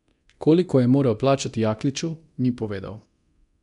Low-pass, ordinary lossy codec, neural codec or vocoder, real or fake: 10.8 kHz; none; codec, 24 kHz, 0.9 kbps, DualCodec; fake